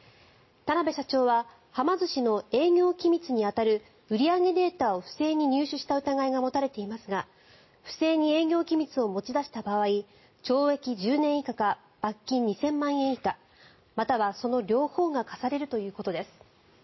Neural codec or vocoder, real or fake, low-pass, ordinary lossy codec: none; real; 7.2 kHz; MP3, 24 kbps